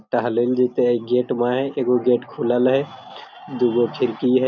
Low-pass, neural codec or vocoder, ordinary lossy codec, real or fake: 7.2 kHz; none; none; real